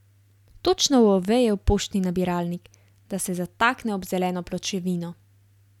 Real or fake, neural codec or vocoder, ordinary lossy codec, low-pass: real; none; none; 19.8 kHz